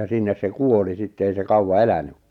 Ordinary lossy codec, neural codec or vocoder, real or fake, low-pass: none; none; real; 19.8 kHz